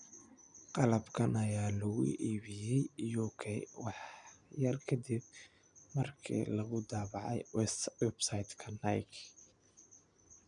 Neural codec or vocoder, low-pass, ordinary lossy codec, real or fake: none; 9.9 kHz; none; real